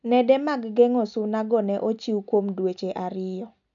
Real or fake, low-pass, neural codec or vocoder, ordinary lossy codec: real; 7.2 kHz; none; none